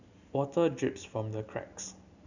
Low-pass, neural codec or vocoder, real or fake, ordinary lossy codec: 7.2 kHz; none; real; none